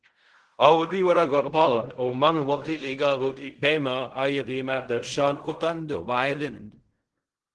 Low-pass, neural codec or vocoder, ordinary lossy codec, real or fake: 10.8 kHz; codec, 16 kHz in and 24 kHz out, 0.4 kbps, LongCat-Audio-Codec, fine tuned four codebook decoder; Opus, 16 kbps; fake